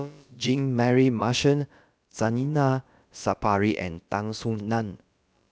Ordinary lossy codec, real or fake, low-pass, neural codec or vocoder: none; fake; none; codec, 16 kHz, about 1 kbps, DyCAST, with the encoder's durations